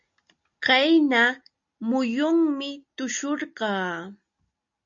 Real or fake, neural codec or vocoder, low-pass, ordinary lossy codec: real; none; 7.2 kHz; MP3, 48 kbps